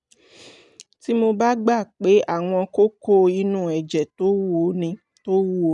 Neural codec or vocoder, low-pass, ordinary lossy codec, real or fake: none; 10.8 kHz; none; real